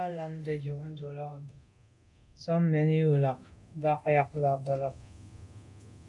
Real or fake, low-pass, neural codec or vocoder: fake; 10.8 kHz; codec, 24 kHz, 0.9 kbps, DualCodec